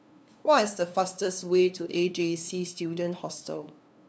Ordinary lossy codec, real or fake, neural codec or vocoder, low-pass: none; fake; codec, 16 kHz, 2 kbps, FunCodec, trained on LibriTTS, 25 frames a second; none